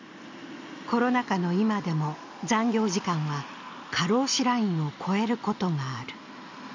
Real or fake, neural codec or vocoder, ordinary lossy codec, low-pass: real; none; MP3, 64 kbps; 7.2 kHz